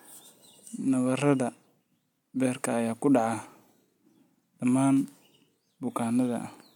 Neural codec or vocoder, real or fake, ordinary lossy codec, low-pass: none; real; none; 19.8 kHz